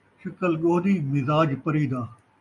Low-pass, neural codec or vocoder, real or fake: 10.8 kHz; none; real